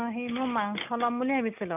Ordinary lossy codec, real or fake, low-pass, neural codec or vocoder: none; real; 3.6 kHz; none